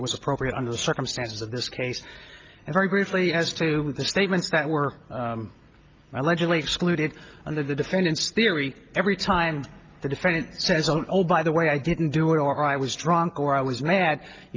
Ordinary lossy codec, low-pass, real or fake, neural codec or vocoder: Opus, 24 kbps; 7.2 kHz; real; none